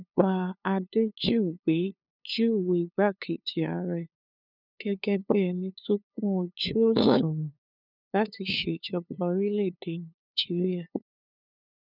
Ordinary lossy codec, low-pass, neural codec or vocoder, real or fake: none; 5.4 kHz; codec, 16 kHz, 2 kbps, FunCodec, trained on LibriTTS, 25 frames a second; fake